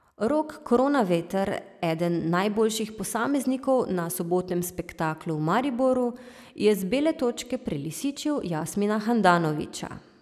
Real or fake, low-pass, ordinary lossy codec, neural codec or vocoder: real; 14.4 kHz; none; none